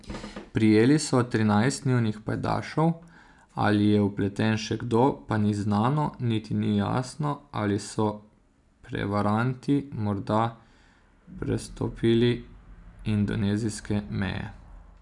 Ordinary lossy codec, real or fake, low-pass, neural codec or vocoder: none; real; 10.8 kHz; none